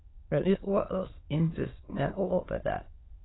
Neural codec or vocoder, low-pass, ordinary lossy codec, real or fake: autoencoder, 22.05 kHz, a latent of 192 numbers a frame, VITS, trained on many speakers; 7.2 kHz; AAC, 16 kbps; fake